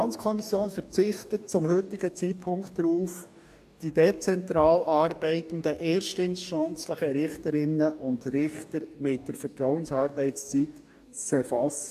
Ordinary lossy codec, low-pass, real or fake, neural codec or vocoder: AAC, 96 kbps; 14.4 kHz; fake; codec, 44.1 kHz, 2.6 kbps, DAC